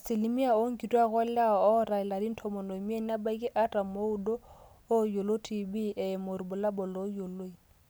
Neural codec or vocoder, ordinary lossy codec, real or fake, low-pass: none; none; real; none